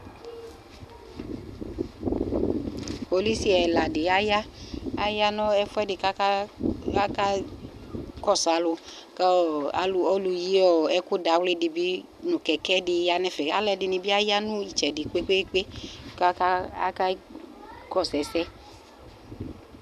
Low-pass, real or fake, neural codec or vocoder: 14.4 kHz; real; none